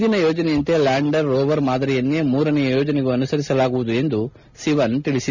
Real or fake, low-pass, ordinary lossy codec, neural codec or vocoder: real; 7.2 kHz; none; none